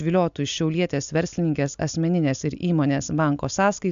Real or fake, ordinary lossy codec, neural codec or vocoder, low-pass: real; AAC, 96 kbps; none; 7.2 kHz